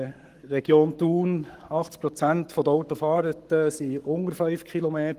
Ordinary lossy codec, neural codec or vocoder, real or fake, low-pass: Opus, 24 kbps; codec, 44.1 kHz, 7.8 kbps, Pupu-Codec; fake; 14.4 kHz